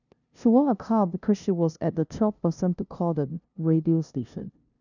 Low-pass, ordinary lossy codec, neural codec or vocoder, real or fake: 7.2 kHz; none; codec, 16 kHz, 0.5 kbps, FunCodec, trained on LibriTTS, 25 frames a second; fake